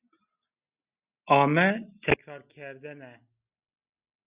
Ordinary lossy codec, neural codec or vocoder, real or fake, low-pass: Opus, 64 kbps; none; real; 3.6 kHz